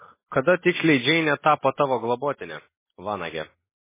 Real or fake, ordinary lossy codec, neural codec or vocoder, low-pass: real; MP3, 16 kbps; none; 3.6 kHz